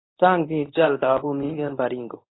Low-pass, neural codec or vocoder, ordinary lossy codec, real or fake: 7.2 kHz; codec, 24 kHz, 0.9 kbps, WavTokenizer, medium speech release version 1; AAC, 16 kbps; fake